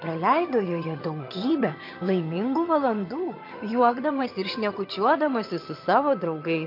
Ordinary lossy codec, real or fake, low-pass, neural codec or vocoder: MP3, 32 kbps; fake; 5.4 kHz; vocoder, 22.05 kHz, 80 mel bands, HiFi-GAN